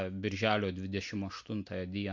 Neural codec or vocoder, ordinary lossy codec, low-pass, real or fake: none; MP3, 48 kbps; 7.2 kHz; real